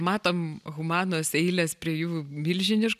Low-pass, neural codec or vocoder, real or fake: 14.4 kHz; none; real